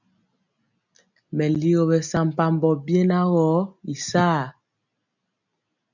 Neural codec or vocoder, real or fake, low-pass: none; real; 7.2 kHz